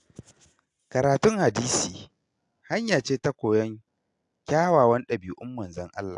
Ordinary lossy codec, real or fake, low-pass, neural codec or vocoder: AAC, 64 kbps; real; 10.8 kHz; none